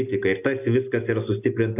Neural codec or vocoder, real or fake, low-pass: none; real; 3.6 kHz